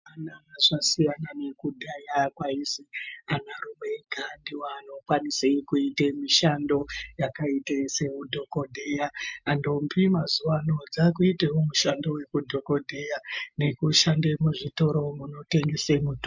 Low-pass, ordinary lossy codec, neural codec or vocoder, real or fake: 7.2 kHz; MP3, 64 kbps; none; real